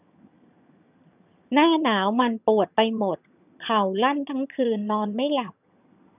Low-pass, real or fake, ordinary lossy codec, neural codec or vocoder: 3.6 kHz; fake; none; vocoder, 22.05 kHz, 80 mel bands, HiFi-GAN